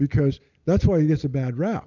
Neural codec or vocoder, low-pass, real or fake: none; 7.2 kHz; real